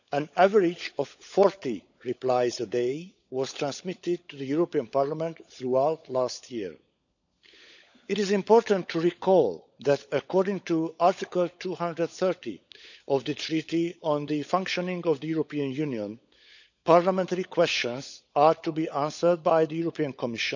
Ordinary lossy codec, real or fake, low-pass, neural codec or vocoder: none; fake; 7.2 kHz; codec, 16 kHz, 16 kbps, FunCodec, trained on LibriTTS, 50 frames a second